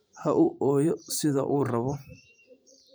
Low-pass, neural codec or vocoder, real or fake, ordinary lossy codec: none; vocoder, 44.1 kHz, 128 mel bands every 512 samples, BigVGAN v2; fake; none